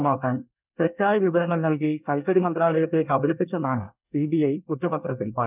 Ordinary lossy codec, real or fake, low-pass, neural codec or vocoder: none; fake; 3.6 kHz; codec, 24 kHz, 1 kbps, SNAC